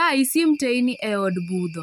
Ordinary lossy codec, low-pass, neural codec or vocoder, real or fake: none; none; none; real